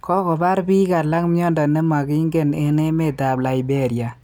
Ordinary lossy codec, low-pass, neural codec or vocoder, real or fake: none; none; none; real